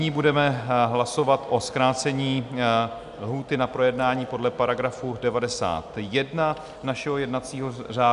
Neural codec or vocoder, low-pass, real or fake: none; 10.8 kHz; real